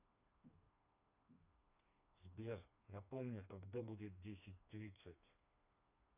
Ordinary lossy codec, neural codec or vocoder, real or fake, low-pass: none; codec, 16 kHz, 2 kbps, FreqCodec, smaller model; fake; 3.6 kHz